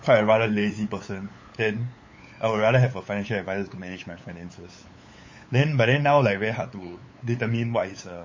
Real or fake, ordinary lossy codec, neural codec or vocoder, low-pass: fake; MP3, 32 kbps; codec, 16 kHz, 8 kbps, FunCodec, trained on LibriTTS, 25 frames a second; 7.2 kHz